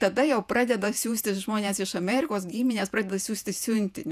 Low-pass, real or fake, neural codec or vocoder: 14.4 kHz; fake; vocoder, 48 kHz, 128 mel bands, Vocos